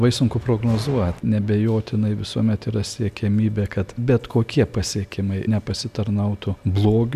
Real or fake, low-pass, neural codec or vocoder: real; 14.4 kHz; none